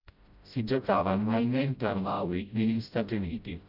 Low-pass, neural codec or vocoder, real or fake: 5.4 kHz; codec, 16 kHz, 0.5 kbps, FreqCodec, smaller model; fake